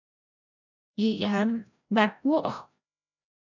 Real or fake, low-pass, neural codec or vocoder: fake; 7.2 kHz; codec, 16 kHz, 0.5 kbps, FreqCodec, larger model